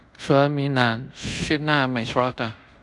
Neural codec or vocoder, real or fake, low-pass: codec, 24 kHz, 0.5 kbps, DualCodec; fake; 10.8 kHz